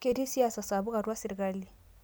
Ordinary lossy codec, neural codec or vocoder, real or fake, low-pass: none; none; real; none